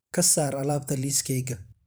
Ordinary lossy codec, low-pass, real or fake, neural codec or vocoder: none; none; fake; vocoder, 44.1 kHz, 128 mel bands every 512 samples, BigVGAN v2